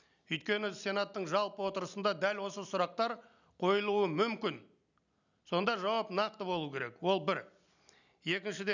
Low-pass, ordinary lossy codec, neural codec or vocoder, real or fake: 7.2 kHz; none; none; real